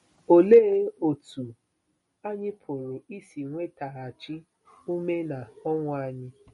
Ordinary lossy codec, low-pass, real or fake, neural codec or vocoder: MP3, 48 kbps; 19.8 kHz; real; none